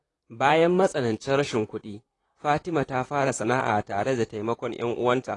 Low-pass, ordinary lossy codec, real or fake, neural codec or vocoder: 10.8 kHz; AAC, 32 kbps; fake; vocoder, 44.1 kHz, 128 mel bands, Pupu-Vocoder